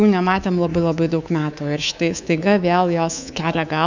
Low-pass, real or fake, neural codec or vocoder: 7.2 kHz; fake; codec, 24 kHz, 3.1 kbps, DualCodec